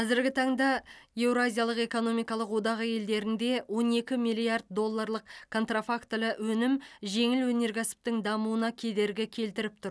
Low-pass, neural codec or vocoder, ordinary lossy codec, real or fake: none; none; none; real